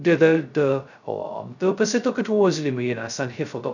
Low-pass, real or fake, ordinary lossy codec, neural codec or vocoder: 7.2 kHz; fake; none; codec, 16 kHz, 0.2 kbps, FocalCodec